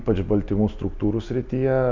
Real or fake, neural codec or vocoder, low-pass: real; none; 7.2 kHz